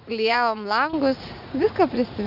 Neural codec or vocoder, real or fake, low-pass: vocoder, 44.1 kHz, 80 mel bands, Vocos; fake; 5.4 kHz